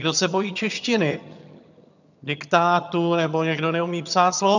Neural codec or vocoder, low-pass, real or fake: vocoder, 22.05 kHz, 80 mel bands, HiFi-GAN; 7.2 kHz; fake